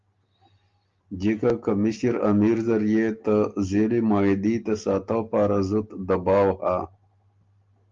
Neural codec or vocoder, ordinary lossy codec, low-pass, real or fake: none; Opus, 32 kbps; 7.2 kHz; real